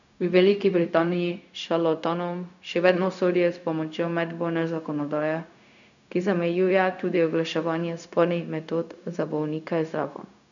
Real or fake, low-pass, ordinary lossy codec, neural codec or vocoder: fake; 7.2 kHz; none; codec, 16 kHz, 0.4 kbps, LongCat-Audio-Codec